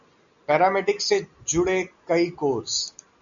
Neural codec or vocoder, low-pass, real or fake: none; 7.2 kHz; real